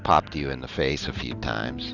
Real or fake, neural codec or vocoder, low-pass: real; none; 7.2 kHz